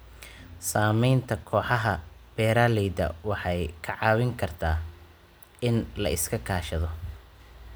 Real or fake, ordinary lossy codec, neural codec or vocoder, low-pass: real; none; none; none